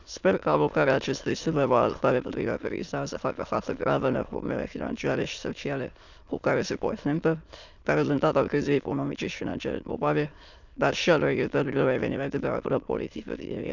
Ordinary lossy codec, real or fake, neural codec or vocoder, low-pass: none; fake; autoencoder, 22.05 kHz, a latent of 192 numbers a frame, VITS, trained on many speakers; 7.2 kHz